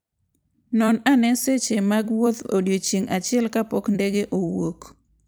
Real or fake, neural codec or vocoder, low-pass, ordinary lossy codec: fake; vocoder, 44.1 kHz, 128 mel bands every 256 samples, BigVGAN v2; none; none